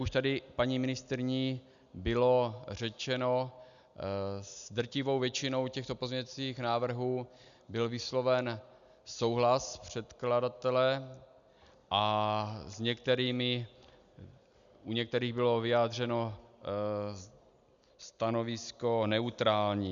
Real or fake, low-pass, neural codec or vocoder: real; 7.2 kHz; none